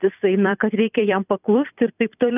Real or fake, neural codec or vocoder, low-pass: fake; vocoder, 44.1 kHz, 128 mel bands every 256 samples, BigVGAN v2; 3.6 kHz